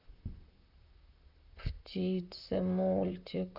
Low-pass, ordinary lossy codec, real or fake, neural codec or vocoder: 5.4 kHz; none; fake; vocoder, 44.1 kHz, 128 mel bands, Pupu-Vocoder